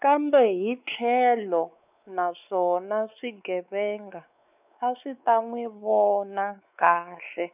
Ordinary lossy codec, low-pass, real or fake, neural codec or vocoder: none; 3.6 kHz; fake; codec, 16 kHz, 4 kbps, X-Codec, WavLM features, trained on Multilingual LibriSpeech